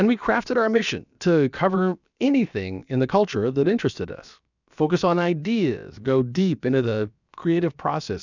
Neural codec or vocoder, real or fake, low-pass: codec, 16 kHz, about 1 kbps, DyCAST, with the encoder's durations; fake; 7.2 kHz